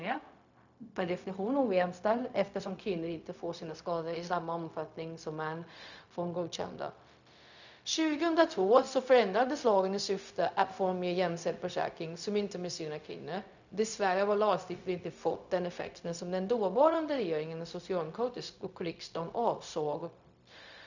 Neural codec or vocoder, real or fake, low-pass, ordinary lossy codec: codec, 16 kHz, 0.4 kbps, LongCat-Audio-Codec; fake; 7.2 kHz; none